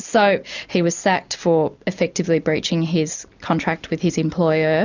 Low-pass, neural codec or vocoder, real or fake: 7.2 kHz; none; real